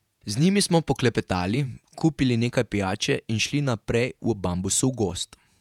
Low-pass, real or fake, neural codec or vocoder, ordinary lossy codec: 19.8 kHz; fake; vocoder, 48 kHz, 128 mel bands, Vocos; none